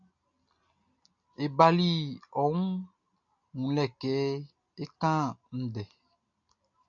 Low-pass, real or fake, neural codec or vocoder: 7.2 kHz; real; none